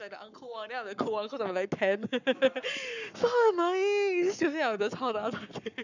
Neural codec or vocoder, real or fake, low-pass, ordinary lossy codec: codec, 44.1 kHz, 7.8 kbps, Pupu-Codec; fake; 7.2 kHz; none